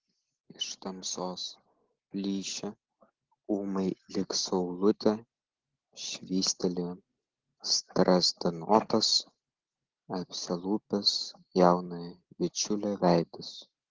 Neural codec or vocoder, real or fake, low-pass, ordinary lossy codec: none; real; 7.2 kHz; Opus, 16 kbps